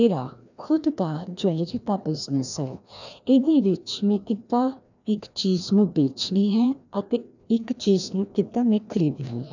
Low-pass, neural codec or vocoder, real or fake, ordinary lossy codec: 7.2 kHz; codec, 16 kHz, 1 kbps, FreqCodec, larger model; fake; none